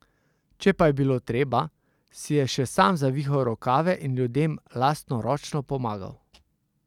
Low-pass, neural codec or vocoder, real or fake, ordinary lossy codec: 19.8 kHz; none; real; none